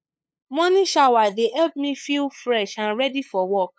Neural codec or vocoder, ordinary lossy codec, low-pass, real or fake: codec, 16 kHz, 8 kbps, FunCodec, trained on LibriTTS, 25 frames a second; none; none; fake